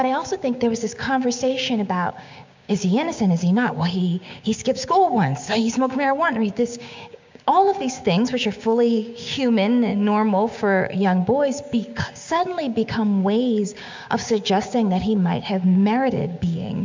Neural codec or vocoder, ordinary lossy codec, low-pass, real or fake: codec, 16 kHz, 6 kbps, DAC; MP3, 64 kbps; 7.2 kHz; fake